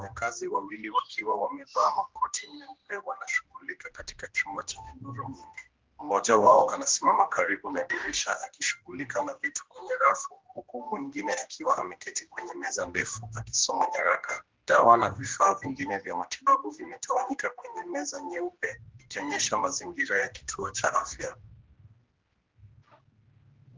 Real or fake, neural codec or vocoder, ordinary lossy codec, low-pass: fake; codec, 16 kHz, 1 kbps, X-Codec, HuBERT features, trained on general audio; Opus, 16 kbps; 7.2 kHz